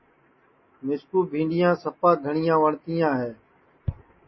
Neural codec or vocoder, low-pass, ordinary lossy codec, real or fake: none; 7.2 kHz; MP3, 24 kbps; real